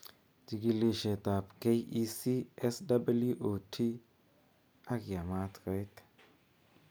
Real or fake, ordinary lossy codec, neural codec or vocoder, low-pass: real; none; none; none